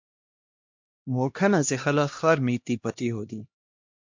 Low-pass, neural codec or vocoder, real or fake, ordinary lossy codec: 7.2 kHz; codec, 16 kHz, 1 kbps, X-Codec, HuBERT features, trained on LibriSpeech; fake; MP3, 48 kbps